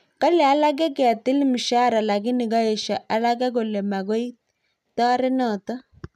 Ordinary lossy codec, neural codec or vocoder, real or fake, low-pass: none; none; real; 14.4 kHz